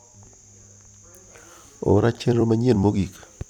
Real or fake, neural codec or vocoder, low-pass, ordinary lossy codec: fake; vocoder, 44.1 kHz, 128 mel bands every 256 samples, BigVGAN v2; 19.8 kHz; none